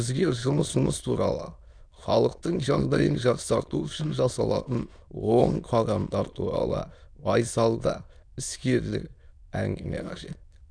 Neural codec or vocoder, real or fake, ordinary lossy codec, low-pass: autoencoder, 22.05 kHz, a latent of 192 numbers a frame, VITS, trained on many speakers; fake; none; 9.9 kHz